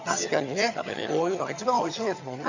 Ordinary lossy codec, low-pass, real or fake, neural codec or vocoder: none; 7.2 kHz; fake; vocoder, 22.05 kHz, 80 mel bands, HiFi-GAN